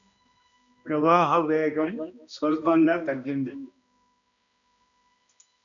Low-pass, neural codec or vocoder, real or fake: 7.2 kHz; codec, 16 kHz, 1 kbps, X-Codec, HuBERT features, trained on balanced general audio; fake